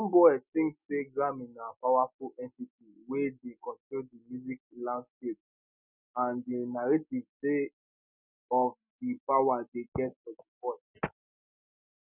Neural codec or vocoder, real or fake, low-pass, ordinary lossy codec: none; real; 3.6 kHz; none